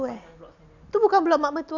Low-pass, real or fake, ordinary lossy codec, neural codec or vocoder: 7.2 kHz; real; none; none